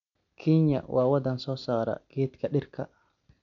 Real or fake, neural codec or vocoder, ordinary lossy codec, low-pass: real; none; none; 7.2 kHz